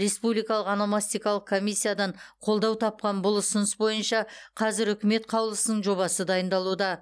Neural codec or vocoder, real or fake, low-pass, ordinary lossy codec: none; real; none; none